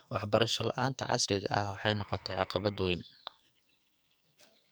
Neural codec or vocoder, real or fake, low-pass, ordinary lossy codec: codec, 44.1 kHz, 2.6 kbps, SNAC; fake; none; none